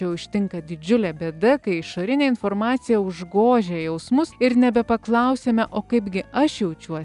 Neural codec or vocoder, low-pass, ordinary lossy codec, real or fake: none; 10.8 kHz; MP3, 96 kbps; real